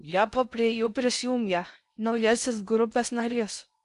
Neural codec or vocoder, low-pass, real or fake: codec, 16 kHz in and 24 kHz out, 0.6 kbps, FocalCodec, streaming, 2048 codes; 10.8 kHz; fake